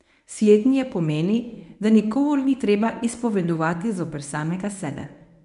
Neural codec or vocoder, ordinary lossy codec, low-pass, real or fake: codec, 24 kHz, 0.9 kbps, WavTokenizer, medium speech release version 1; none; 10.8 kHz; fake